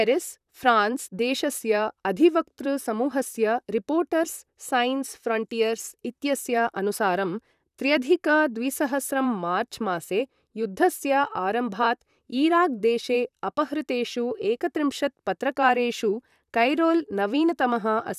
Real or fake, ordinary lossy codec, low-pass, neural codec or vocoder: fake; none; 14.4 kHz; vocoder, 44.1 kHz, 128 mel bands, Pupu-Vocoder